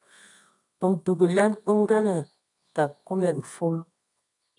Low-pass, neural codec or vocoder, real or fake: 10.8 kHz; codec, 24 kHz, 0.9 kbps, WavTokenizer, medium music audio release; fake